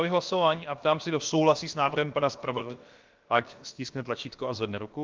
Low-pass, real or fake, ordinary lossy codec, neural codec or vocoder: 7.2 kHz; fake; Opus, 32 kbps; codec, 16 kHz, about 1 kbps, DyCAST, with the encoder's durations